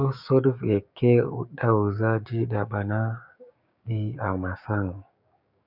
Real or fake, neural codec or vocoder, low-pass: fake; codec, 16 kHz, 6 kbps, DAC; 5.4 kHz